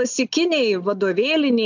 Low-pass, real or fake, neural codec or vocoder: 7.2 kHz; real; none